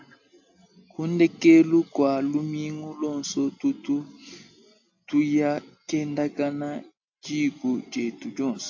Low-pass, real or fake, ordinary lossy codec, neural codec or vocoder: 7.2 kHz; real; AAC, 48 kbps; none